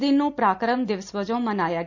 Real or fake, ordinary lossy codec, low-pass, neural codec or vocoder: real; none; 7.2 kHz; none